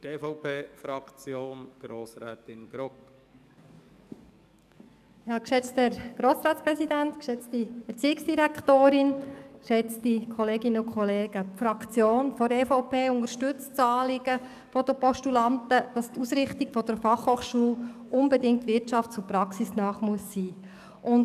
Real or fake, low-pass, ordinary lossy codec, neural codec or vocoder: fake; 14.4 kHz; none; codec, 44.1 kHz, 7.8 kbps, DAC